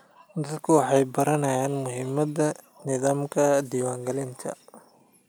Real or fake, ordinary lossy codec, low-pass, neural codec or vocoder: real; none; none; none